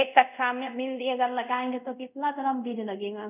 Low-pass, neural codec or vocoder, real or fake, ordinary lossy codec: 3.6 kHz; codec, 24 kHz, 0.5 kbps, DualCodec; fake; none